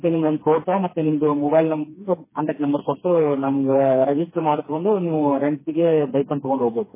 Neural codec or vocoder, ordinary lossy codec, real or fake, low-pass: codec, 16 kHz, 2 kbps, FreqCodec, smaller model; MP3, 16 kbps; fake; 3.6 kHz